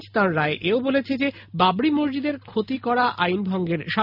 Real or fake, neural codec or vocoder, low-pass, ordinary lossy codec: real; none; 5.4 kHz; none